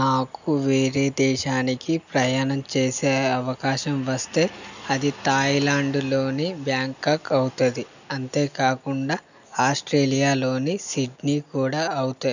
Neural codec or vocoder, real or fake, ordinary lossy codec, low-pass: none; real; none; 7.2 kHz